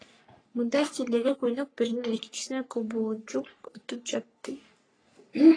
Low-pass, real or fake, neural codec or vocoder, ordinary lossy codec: 9.9 kHz; fake; codec, 44.1 kHz, 3.4 kbps, Pupu-Codec; AAC, 32 kbps